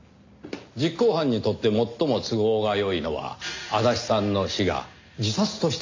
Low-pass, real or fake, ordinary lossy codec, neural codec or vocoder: 7.2 kHz; real; MP3, 48 kbps; none